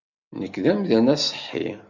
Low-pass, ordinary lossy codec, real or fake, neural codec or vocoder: 7.2 kHz; MP3, 64 kbps; real; none